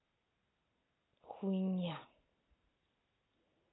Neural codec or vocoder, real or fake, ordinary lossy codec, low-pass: none; real; AAC, 16 kbps; 7.2 kHz